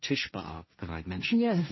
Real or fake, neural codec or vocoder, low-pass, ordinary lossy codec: fake; codec, 16 kHz, 1.1 kbps, Voila-Tokenizer; 7.2 kHz; MP3, 24 kbps